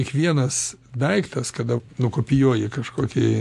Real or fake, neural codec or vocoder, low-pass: real; none; 14.4 kHz